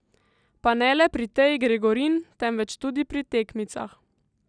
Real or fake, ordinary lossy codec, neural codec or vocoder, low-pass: real; none; none; 9.9 kHz